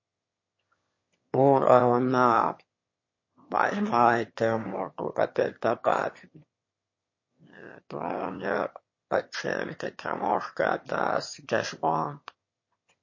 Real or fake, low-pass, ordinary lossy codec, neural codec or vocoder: fake; 7.2 kHz; MP3, 32 kbps; autoencoder, 22.05 kHz, a latent of 192 numbers a frame, VITS, trained on one speaker